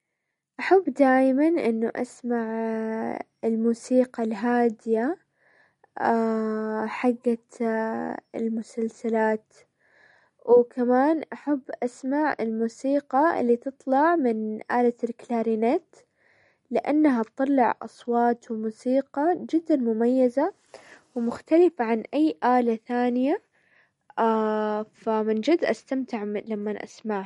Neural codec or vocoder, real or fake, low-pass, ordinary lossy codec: none; real; 19.8 kHz; MP3, 48 kbps